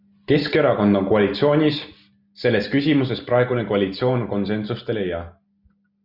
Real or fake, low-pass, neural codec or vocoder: real; 5.4 kHz; none